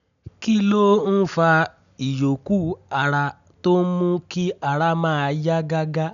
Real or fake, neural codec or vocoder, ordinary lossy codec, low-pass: real; none; none; 7.2 kHz